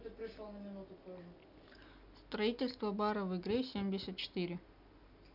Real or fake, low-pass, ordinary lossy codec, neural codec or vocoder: real; 5.4 kHz; none; none